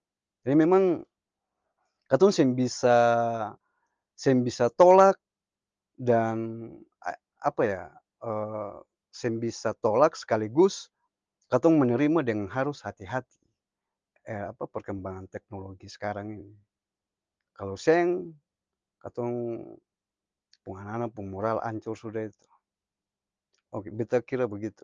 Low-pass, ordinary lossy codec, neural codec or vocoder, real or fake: 7.2 kHz; Opus, 24 kbps; none; real